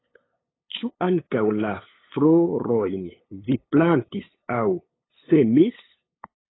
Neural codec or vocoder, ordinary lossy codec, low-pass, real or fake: codec, 16 kHz, 8 kbps, FunCodec, trained on LibriTTS, 25 frames a second; AAC, 16 kbps; 7.2 kHz; fake